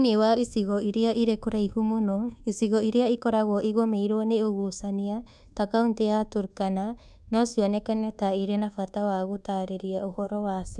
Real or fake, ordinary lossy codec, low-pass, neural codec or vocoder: fake; none; none; codec, 24 kHz, 1.2 kbps, DualCodec